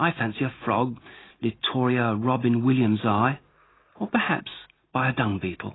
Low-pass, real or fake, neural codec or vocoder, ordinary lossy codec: 7.2 kHz; real; none; AAC, 16 kbps